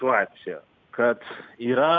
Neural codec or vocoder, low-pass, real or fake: none; 7.2 kHz; real